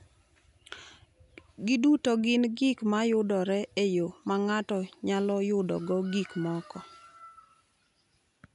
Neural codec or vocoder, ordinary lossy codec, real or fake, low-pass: none; none; real; 10.8 kHz